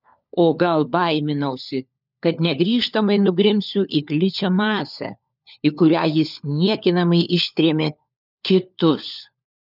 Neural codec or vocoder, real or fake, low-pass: codec, 16 kHz, 4 kbps, FunCodec, trained on LibriTTS, 50 frames a second; fake; 5.4 kHz